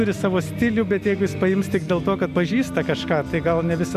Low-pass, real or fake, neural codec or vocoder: 14.4 kHz; real; none